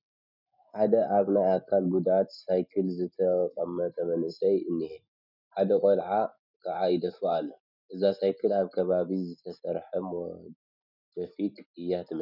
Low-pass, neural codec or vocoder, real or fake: 5.4 kHz; none; real